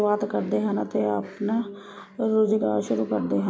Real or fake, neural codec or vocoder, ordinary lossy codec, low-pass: real; none; none; none